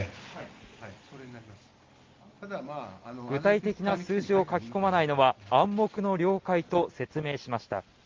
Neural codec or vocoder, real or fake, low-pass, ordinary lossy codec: none; real; 7.2 kHz; Opus, 32 kbps